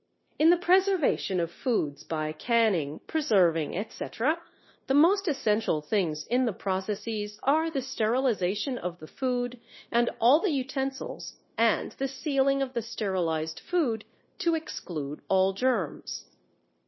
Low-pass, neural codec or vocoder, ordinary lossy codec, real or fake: 7.2 kHz; codec, 16 kHz, 0.9 kbps, LongCat-Audio-Codec; MP3, 24 kbps; fake